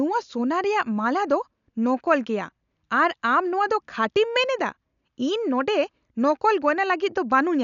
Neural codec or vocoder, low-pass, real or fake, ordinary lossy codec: none; 7.2 kHz; real; none